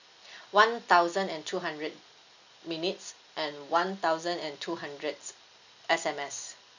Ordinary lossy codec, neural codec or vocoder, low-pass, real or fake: none; none; 7.2 kHz; real